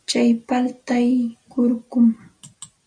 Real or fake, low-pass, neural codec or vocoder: real; 9.9 kHz; none